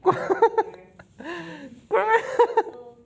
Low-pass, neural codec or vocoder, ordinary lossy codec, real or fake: none; none; none; real